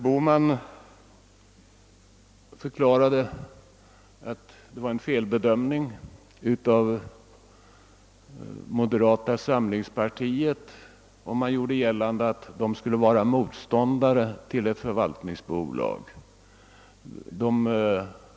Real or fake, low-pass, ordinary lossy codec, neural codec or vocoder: real; none; none; none